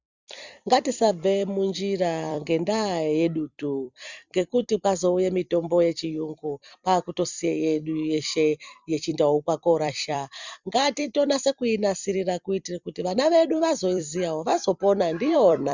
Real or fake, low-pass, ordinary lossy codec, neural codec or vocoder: fake; 7.2 kHz; Opus, 64 kbps; vocoder, 44.1 kHz, 128 mel bands every 256 samples, BigVGAN v2